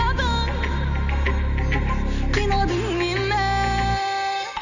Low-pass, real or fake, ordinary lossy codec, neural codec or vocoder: 7.2 kHz; real; none; none